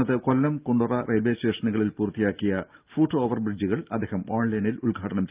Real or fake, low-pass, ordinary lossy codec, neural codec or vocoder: real; 3.6 kHz; Opus, 24 kbps; none